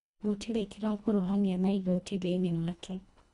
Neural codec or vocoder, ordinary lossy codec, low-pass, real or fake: codec, 24 kHz, 1.5 kbps, HILCodec; AAC, 96 kbps; 10.8 kHz; fake